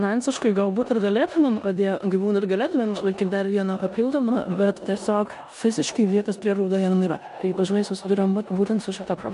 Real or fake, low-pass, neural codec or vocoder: fake; 10.8 kHz; codec, 16 kHz in and 24 kHz out, 0.9 kbps, LongCat-Audio-Codec, four codebook decoder